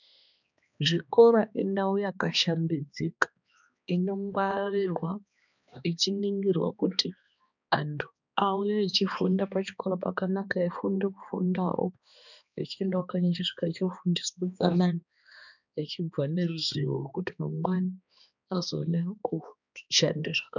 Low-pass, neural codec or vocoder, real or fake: 7.2 kHz; codec, 16 kHz, 2 kbps, X-Codec, HuBERT features, trained on balanced general audio; fake